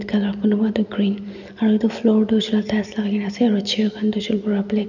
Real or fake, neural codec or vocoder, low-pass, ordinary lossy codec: real; none; 7.2 kHz; none